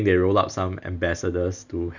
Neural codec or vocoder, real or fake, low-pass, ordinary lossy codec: none; real; 7.2 kHz; none